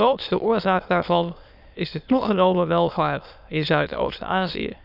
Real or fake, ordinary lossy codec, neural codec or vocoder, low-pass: fake; none; autoencoder, 22.05 kHz, a latent of 192 numbers a frame, VITS, trained on many speakers; 5.4 kHz